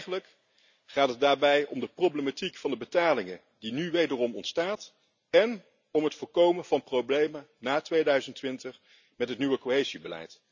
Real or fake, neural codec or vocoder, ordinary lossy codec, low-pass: real; none; none; 7.2 kHz